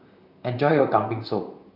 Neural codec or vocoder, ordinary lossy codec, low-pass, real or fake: vocoder, 44.1 kHz, 128 mel bands, Pupu-Vocoder; none; 5.4 kHz; fake